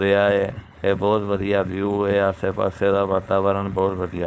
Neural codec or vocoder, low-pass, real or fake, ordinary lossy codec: codec, 16 kHz, 4.8 kbps, FACodec; none; fake; none